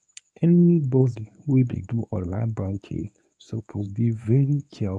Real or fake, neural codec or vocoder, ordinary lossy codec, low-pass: fake; codec, 24 kHz, 0.9 kbps, WavTokenizer, medium speech release version 1; none; none